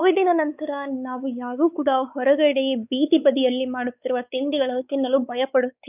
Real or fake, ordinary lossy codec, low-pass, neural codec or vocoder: fake; none; 3.6 kHz; codec, 16 kHz, 4 kbps, X-Codec, WavLM features, trained on Multilingual LibriSpeech